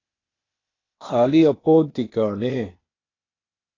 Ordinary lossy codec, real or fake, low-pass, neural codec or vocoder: AAC, 32 kbps; fake; 7.2 kHz; codec, 16 kHz, 0.8 kbps, ZipCodec